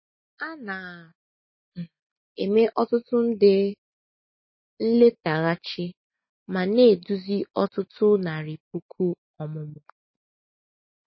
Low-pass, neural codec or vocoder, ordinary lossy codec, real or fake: 7.2 kHz; none; MP3, 24 kbps; real